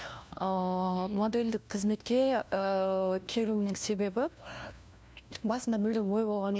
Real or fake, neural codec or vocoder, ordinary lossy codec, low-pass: fake; codec, 16 kHz, 1 kbps, FunCodec, trained on LibriTTS, 50 frames a second; none; none